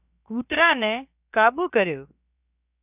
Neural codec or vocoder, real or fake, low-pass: codec, 16 kHz, 0.7 kbps, FocalCodec; fake; 3.6 kHz